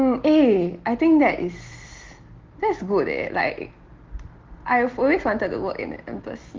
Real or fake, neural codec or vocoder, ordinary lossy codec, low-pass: real; none; Opus, 16 kbps; 7.2 kHz